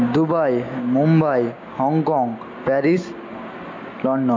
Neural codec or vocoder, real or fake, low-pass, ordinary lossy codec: none; real; 7.2 kHz; MP3, 48 kbps